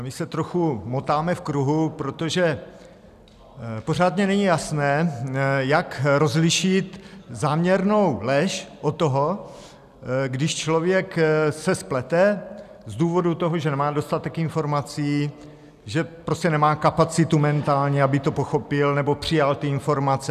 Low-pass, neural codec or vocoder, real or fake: 14.4 kHz; none; real